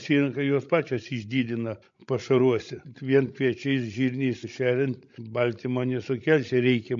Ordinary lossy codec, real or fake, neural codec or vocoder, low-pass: MP3, 48 kbps; fake; codec, 16 kHz, 16 kbps, FreqCodec, larger model; 7.2 kHz